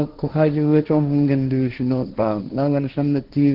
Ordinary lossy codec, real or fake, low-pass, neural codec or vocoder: Opus, 16 kbps; fake; 5.4 kHz; codec, 16 kHz, 1.1 kbps, Voila-Tokenizer